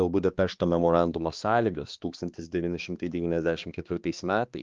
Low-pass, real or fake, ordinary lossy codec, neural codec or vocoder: 7.2 kHz; fake; Opus, 32 kbps; codec, 16 kHz, 2 kbps, X-Codec, HuBERT features, trained on balanced general audio